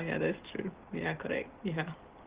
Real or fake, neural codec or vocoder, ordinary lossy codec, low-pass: real; none; Opus, 16 kbps; 3.6 kHz